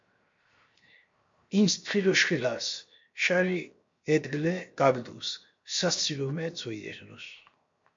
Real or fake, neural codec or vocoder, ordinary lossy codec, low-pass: fake; codec, 16 kHz, 0.7 kbps, FocalCodec; MP3, 48 kbps; 7.2 kHz